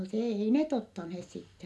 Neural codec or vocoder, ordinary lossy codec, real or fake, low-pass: none; none; real; none